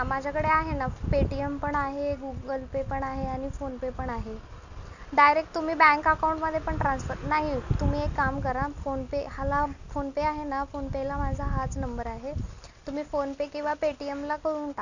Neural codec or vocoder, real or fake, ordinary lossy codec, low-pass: none; real; none; 7.2 kHz